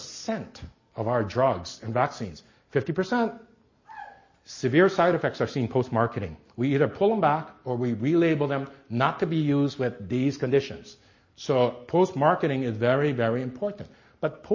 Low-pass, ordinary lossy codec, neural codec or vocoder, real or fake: 7.2 kHz; MP3, 32 kbps; none; real